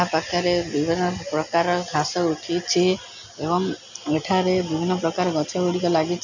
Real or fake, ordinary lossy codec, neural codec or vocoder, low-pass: real; none; none; 7.2 kHz